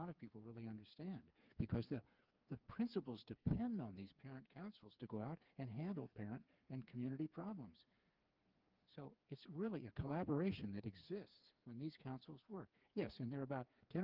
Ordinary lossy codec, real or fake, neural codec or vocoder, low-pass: Opus, 32 kbps; fake; codec, 16 kHz, 4 kbps, FreqCodec, smaller model; 5.4 kHz